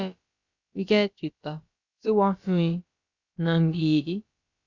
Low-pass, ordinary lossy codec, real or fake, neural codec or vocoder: 7.2 kHz; Opus, 64 kbps; fake; codec, 16 kHz, about 1 kbps, DyCAST, with the encoder's durations